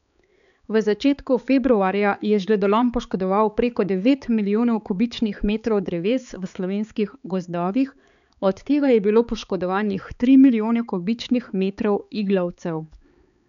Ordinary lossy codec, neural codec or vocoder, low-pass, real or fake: none; codec, 16 kHz, 4 kbps, X-Codec, HuBERT features, trained on balanced general audio; 7.2 kHz; fake